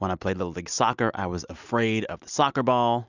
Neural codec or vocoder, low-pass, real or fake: none; 7.2 kHz; real